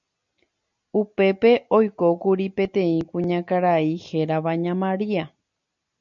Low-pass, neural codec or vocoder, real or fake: 7.2 kHz; none; real